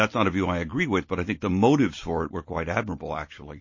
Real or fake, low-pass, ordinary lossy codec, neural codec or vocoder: real; 7.2 kHz; MP3, 32 kbps; none